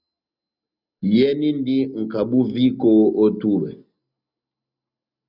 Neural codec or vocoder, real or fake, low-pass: none; real; 5.4 kHz